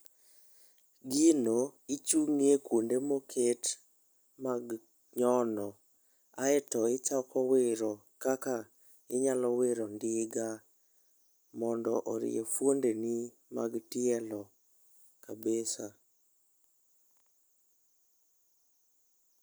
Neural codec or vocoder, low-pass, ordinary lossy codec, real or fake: none; none; none; real